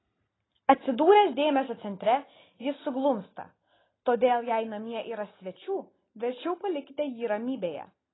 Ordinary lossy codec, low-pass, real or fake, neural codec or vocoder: AAC, 16 kbps; 7.2 kHz; real; none